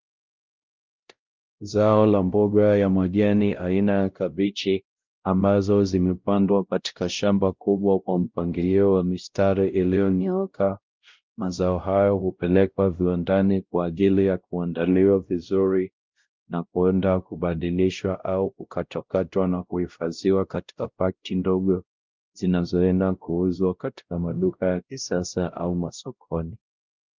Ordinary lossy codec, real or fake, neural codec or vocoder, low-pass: Opus, 32 kbps; fake; codec, 16 kHz, 0.5 kbps, X-Codec, WavLM features, trained on Multilingual LibriSpeech; 7.2 kHz